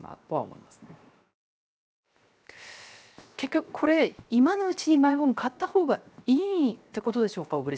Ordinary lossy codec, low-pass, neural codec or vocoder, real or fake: none; none; codec, 16 kHz, 0.7 kbps, FocalCodec; fake